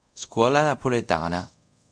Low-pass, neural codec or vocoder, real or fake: 9.9 kHz; codec, 24 kHz, 0.5 kbps, DualCodec; fake